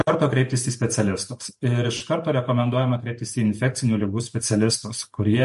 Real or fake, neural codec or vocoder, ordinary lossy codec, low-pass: fake; vocoder, 44.1 kHz, 128 mel bands every 512 samples, BigVGAN v2; MP3, 48 kbps; 14.4 kHz